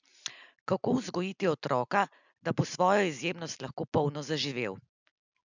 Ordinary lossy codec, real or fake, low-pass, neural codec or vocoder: none; real; 7.2 kHz; none